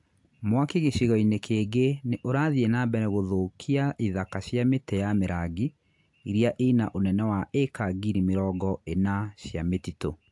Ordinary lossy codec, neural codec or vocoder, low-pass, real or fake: AAC, 64 kbps; none; 10.8 kHz; real